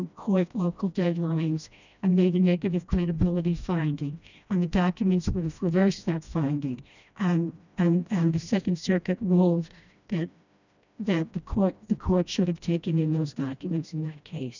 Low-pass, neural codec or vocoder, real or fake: 7.2 kHz; codec, 16 kHz, 1 kbps, FreqCodec, smaller model; fake